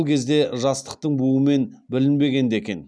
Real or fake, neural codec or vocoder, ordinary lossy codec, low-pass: real; none; none; none